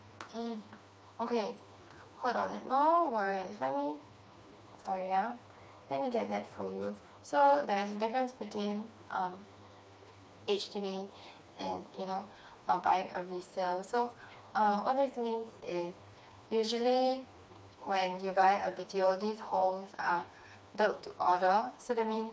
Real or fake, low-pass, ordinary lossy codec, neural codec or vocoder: fake; none; none; codec, 16 kHz, 2 kbps, FreqCodec, smaller model